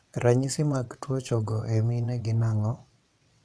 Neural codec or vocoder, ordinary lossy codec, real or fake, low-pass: vocoder, 22.05 kHz, 80 mel bands, WaveNeXt; none; fake; none